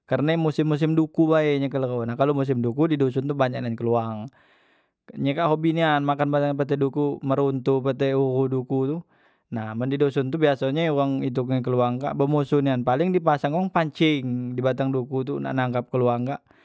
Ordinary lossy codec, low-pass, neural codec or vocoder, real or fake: none; none; none; real